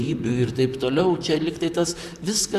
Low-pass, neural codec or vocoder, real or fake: 14.4 kHz; vocoder, 44.1 kHz, 128 mel bands, Pupu-Vocoder; fake